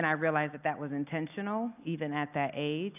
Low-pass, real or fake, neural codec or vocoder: 3.6 kHz; real; none